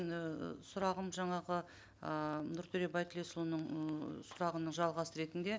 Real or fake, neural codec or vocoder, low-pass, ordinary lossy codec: real; none; none; none